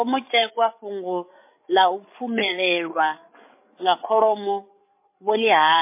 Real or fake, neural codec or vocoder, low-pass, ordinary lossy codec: fake; codec, 16 kHz, 8 kbps, FreqCodec, larger model; 3.6 kHz; MP3, 24 kbps